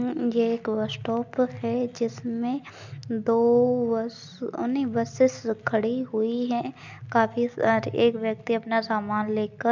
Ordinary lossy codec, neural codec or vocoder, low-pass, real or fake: MP3, 64 kbps; none; 7.2 kHz; real